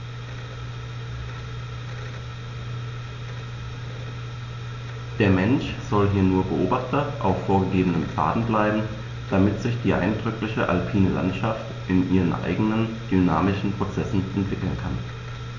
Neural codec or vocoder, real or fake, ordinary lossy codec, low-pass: none; real; none; 7.2 kHz